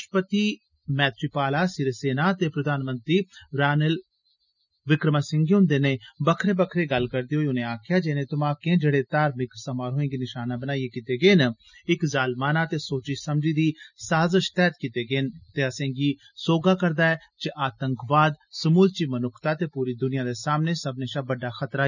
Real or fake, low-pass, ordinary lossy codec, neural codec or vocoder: real; 7.2 kHz; none; none